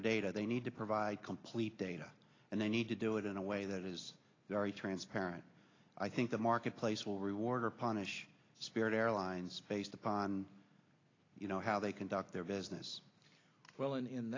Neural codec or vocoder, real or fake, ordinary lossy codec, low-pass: none; real; AAC, 32 kbps; 7.2 kHz